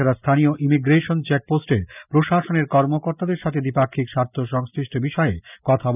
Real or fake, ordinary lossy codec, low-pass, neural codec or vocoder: real; none; 3.6 kHz; none